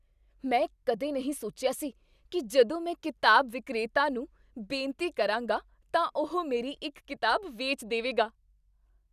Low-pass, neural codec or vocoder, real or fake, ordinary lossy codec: 14.4 kHz; none; real; none